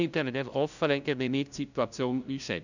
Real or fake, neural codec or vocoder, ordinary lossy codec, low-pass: fake; codec, 16 kHz, 0.5 kbps, FunCodec, trained on LibriTTS, 25 frames a second; none; 7.2 kHz